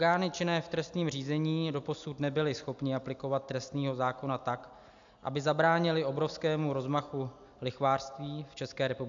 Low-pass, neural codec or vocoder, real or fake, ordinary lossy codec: 7.2 kHz; none; real; AAC, 64 kbps